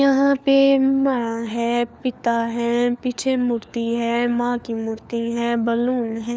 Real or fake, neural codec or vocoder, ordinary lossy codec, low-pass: fake; codec, 16 kHz, 4 kbps, FunCodec, trained on LibriTTS, 50 frames a second; none; none